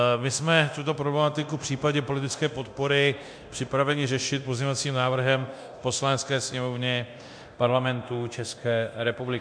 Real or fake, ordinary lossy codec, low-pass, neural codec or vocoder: fake; MP3, 64 kbps; 9.9 kHz; codec, 24 kHz, 0.9 kbps, DualCodec